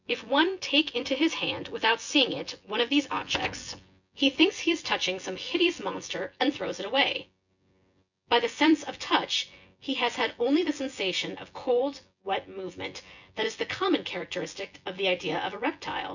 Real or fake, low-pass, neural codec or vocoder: fake; 7.2 kHz; vocoder, 24 kHz, 100 mel bands, Vocos